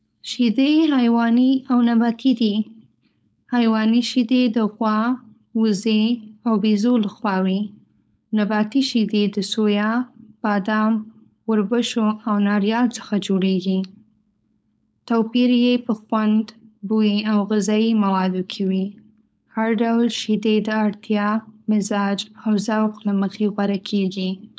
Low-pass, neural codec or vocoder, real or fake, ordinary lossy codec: none; codec, 16 kHz, 4.8 kbps, FACodec; fake; none